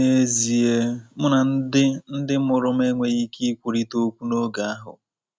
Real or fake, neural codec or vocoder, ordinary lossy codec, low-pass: real; none; none; none